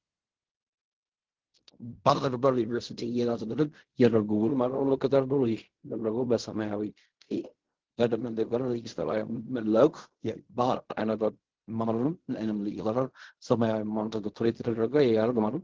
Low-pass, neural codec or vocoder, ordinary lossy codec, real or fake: 7.2 kHz; codec, 16 kHz in and 24 kHz out, 0.4 kbps, LongCat-Audio-Codec, fine tuned four codebook decoder; Opus, 16 kbps; fake